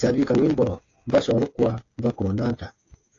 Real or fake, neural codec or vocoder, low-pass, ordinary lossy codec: fake; codec, 16 kHz, 8 kbps, FreqCodec, smaller model; 7.2 kHz; AAC, 32 kbps